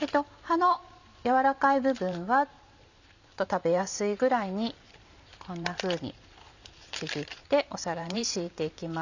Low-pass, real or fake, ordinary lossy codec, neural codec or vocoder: 7.2 kHz; fake; none; vocoder, 44.1 kHz, 128 mel bands every 512 samples, BigVGAN v2